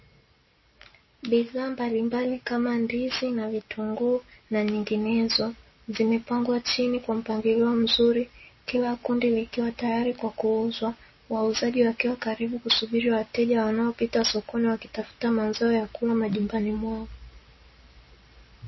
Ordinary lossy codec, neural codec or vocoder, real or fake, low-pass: MP3, 24 kbps; none; real; 7.2 kHz